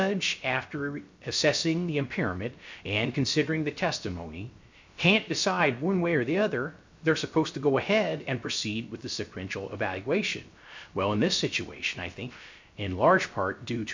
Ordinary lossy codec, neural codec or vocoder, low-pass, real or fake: MP3, 64 kbps; codec, 16 kHz, 0.3 kbps, FocalCodec; 7.2 kHz; fake